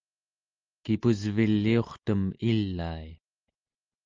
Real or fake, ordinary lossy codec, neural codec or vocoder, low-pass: real; Opus, 24 kbps; none; 7.2 kHz